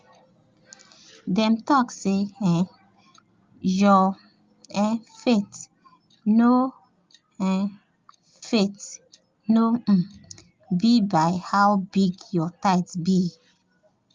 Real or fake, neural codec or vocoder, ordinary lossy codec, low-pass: real; none; Opus, 32 kbps; 7.2 kHz